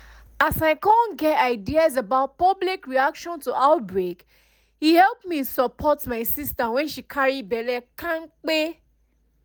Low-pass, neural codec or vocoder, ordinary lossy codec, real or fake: none; none; none; real